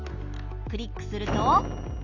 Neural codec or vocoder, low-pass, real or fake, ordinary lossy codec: none; 7.2 kHz; real; none